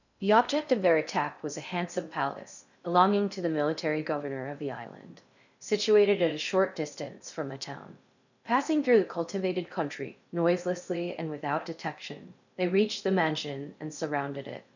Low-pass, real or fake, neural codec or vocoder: 7.2 kHz; fake; codec, 16 kHz in and 24 kHz out, 0.6 kbps, FocalCodec, streaming, 2048 codes